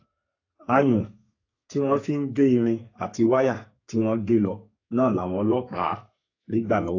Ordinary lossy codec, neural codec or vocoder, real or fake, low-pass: AAC, 32 kbps; codec, 32 kHz, 1.9 kbps, SNAC; fake; 7.2 kHz